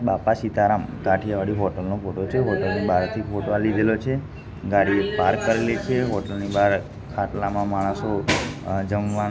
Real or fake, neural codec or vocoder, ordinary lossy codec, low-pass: real; none; none; none